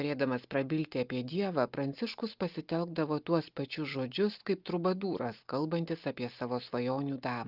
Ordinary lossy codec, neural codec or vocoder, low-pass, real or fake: Opus, 32 kbps; none; 5.4 kHz; real